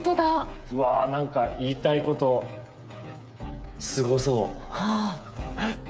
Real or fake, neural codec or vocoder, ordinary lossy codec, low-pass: fake; codec, 16 kHz, 8 kbps, FreqCodec, smaller model; none; none